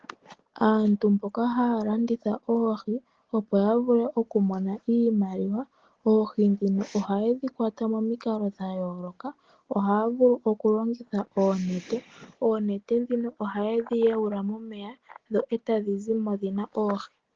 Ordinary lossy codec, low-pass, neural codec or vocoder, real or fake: Opus, 16 kbps; 7.2 kHz; none; real